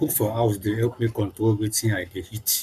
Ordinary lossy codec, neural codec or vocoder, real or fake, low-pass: none; codec, 44.1 kHz, 7.8 kbps, Pupu-Codec; fake; 14.4 kHz